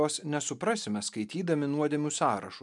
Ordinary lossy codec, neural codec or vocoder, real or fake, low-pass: MP3, 96 kbps; none; real; 10.8 kHz